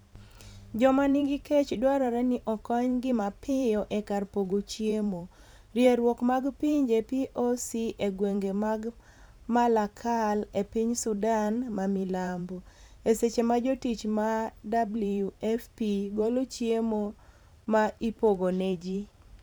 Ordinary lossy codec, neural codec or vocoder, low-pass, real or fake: none; vocoder, 44.1 kHz, 128 mel bands every 512 samples, BigVGAN v2; none; fake